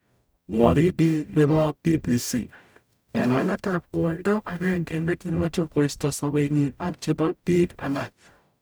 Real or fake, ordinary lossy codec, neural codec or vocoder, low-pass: fake; none; codec, 44.1 kHz, 0.9 kbps, DAC; none